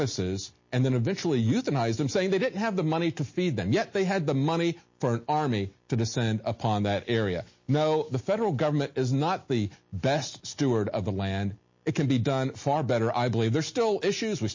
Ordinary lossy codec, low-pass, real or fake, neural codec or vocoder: MP3, 32 kbps; 7.2 kHz; real; none